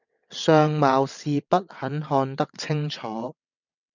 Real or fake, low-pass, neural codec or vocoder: fake; 7.2 kHz; vocoder, 22.05 kHz, 80 mel bands, WaveNeXt